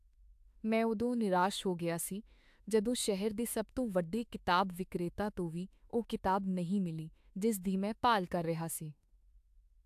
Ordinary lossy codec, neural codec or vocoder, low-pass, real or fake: AAC, 96 kbps; autoencoder, 48 kHz, 32 numbers a frame, DAC-VAE, trained on Japanese speech; 14.4 kHz; fake